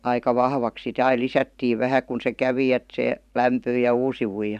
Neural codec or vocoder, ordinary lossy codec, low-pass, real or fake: vocoder, 44.1 kHz, 128 mel bands every 512 samples, BigVGAN v2; none; 14.4 kHz; fake